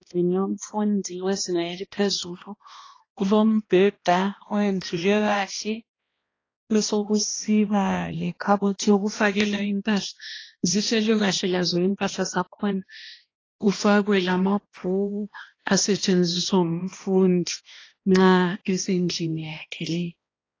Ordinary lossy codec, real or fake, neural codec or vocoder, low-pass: AAC, 32 kbps; fake; codec, 16 kHz, 1 kbps, X-Codec, HuBERT features, trained on balanced general audio; 7.2 kHz